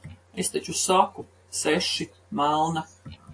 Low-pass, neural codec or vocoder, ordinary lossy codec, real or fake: 9.9 kHz; none; AAC, 48 kbps; real